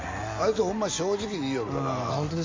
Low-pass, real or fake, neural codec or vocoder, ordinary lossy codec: 7.2 kHz; real; none; MP3, 48 kbps